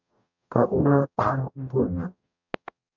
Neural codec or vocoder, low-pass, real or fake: codec, 44.1 kHz, 0.9 kbps, DAC; 7.2 kHz; fake